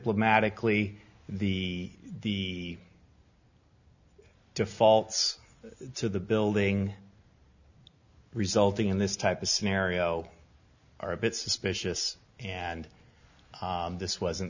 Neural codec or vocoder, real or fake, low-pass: none; real; 7.2 kHz